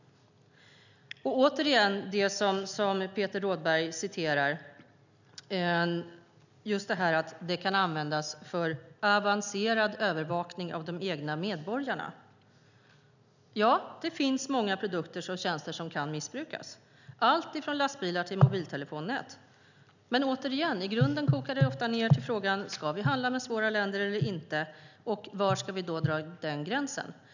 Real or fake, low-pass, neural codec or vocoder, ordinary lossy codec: real; 7.2 kHz; none; none